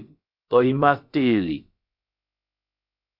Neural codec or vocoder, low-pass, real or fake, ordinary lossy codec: codec, 16 kHz, about 1 kbps, DyCAST, with the encoder's durations; 5.4 kHz; fake; AAC, 48 kbps